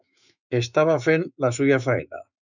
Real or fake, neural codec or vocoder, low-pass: fake; autoencoder, 48 kHz, 128 numbers a frame, DAC-VAE, trained on Japanese speech; 7.2 kHz